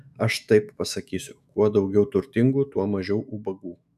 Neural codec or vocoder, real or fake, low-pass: autoencoder, 48 kHz, 128 numbers a frame, DAC-VAE, trained on Japanese speech; fake; 14.4 kHz